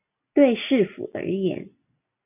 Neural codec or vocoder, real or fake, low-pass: none; real; 3.6 kHz